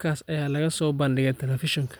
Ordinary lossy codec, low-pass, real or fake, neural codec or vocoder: none; none; real; none